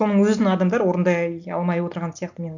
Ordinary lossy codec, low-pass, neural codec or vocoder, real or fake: none; 7.2 kHz; none; real